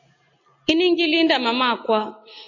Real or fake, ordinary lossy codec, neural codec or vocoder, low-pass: real; AAC, 48 kbps; none; 7.2 kHz